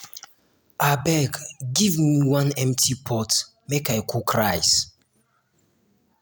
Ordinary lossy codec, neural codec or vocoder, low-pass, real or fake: none; none; none; real